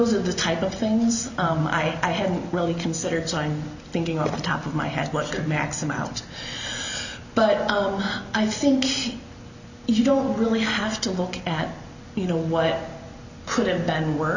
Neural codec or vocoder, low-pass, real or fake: none; 7.2 kHz; real